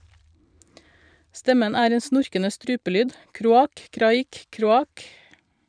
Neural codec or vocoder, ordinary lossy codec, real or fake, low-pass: vocoder, 44.1 kHz, 128 mel bands every 512 samples, BigVGAN v2; none; fake; 9.9 kHz